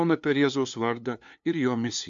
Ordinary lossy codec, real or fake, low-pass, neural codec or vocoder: AAC, 64 kbps; fake; 7.2 kHz; codec, 16 kHz, 2 kbps, FunCodec, trained on LibriTTS, 25 frames a second